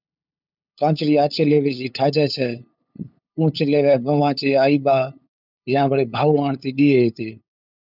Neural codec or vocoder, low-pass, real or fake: codec, 16 kHz, 8 kbps, FunCodec, trained on LibriTTS, 25 frames a second; 5.4 kHz; fake